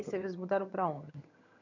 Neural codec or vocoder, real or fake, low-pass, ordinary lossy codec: vocoder, 22.05 kHz, 80 mel bands, HiFi-GAN; fake; 7.2 kHz; none